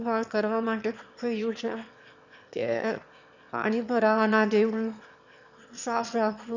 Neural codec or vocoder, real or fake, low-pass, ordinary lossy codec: autoencoder, 22.05 kHz, a latent of 192 numbers a frame, VITS, trained on one speaker; fake; 7.2 kHz; none